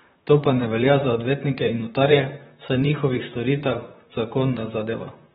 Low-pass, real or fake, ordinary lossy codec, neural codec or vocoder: 19.8 kHz; fake; AAC, 16 kbps; vocoder, 44.1 kHz, 128 mel bands, Pupu-Vocoder